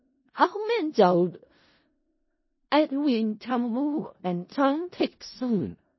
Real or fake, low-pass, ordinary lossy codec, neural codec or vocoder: fake; 7.2 kHz; MP3, 24 kbps; codec, 16 kHz in and 24 kHz out, 0.4 kbps, LongCat-Audio-Codec, four codebook decoder